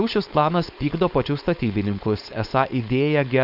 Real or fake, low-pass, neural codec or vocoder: fake; 5.4 kHz; codec, 16 kHz, 4.8 kbps, FACodec